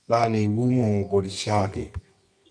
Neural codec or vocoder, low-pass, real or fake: codec, 24 kHz, 0.9 kbps, WavTokenizer, medium music audio release; 9.9 kHz; fake